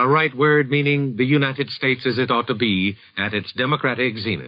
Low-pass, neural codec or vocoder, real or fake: 5.4 kHz; codec, 44.1 kHz, 7.8 kbps, DAC; fake